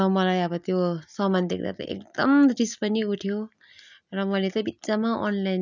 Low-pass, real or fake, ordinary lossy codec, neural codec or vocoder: 7.2 kHz; real; none; none